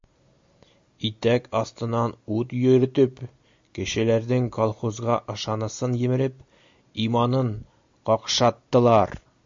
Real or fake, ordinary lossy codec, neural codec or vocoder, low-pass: real; MP3, 48 kbps; none; 7.2 kHz